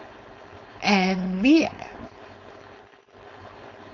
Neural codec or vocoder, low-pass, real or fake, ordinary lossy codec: codec, 16 kHz, 4.8 kbps, FACodec; 7.2 kHz; fake; none